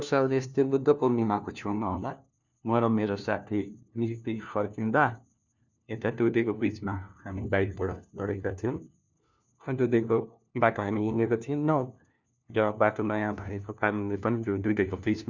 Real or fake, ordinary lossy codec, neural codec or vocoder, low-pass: fake; none; codec, 16 kHz, 1 kbps, FunCodec, trained on LibriTTS, 50 frames a second; 7.2 kHz